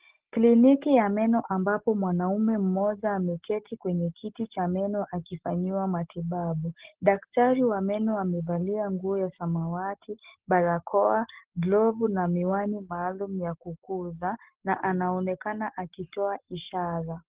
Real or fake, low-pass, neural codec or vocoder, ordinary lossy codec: real; 3.6 kHz; none; Opus, 16 kbps